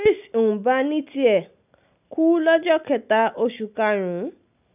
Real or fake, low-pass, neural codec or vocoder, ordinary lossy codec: real; 3.6 kHz; none; none